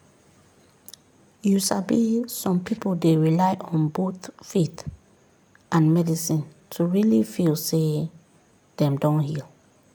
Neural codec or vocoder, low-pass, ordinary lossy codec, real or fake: none; none; none; real